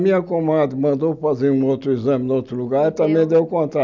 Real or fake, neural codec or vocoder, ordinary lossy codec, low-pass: real; none; none; 7.2 kHz